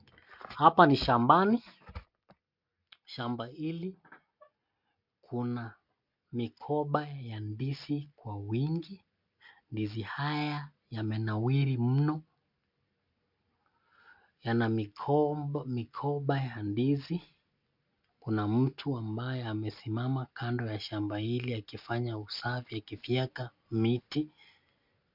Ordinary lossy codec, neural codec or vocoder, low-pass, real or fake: MP3, 48 kbps; none; 5.4 kHz; real